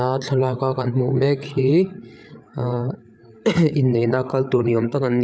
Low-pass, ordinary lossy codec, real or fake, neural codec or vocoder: none; none; fake; codec, 16 kHz, 8 kbps, FreqCodec, larger model